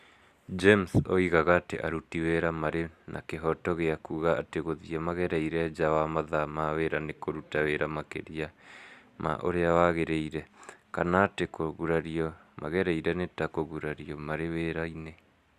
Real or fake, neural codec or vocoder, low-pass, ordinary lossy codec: real; none; 14.4 kHz; Opus, 64 kbps